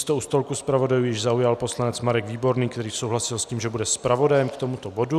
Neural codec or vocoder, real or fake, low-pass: none; real; 14.4 kHz